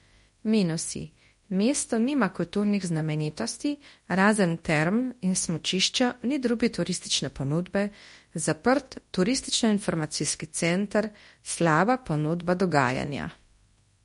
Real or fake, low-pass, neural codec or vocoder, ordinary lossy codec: fake; 10.8 kHz; codec, 24 kHz, 0.9 kbps, WavTokenizer, large speech release; MP3, 48 kbps